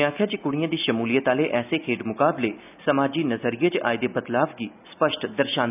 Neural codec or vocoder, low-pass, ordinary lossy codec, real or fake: none; 3.6 kHz; none; real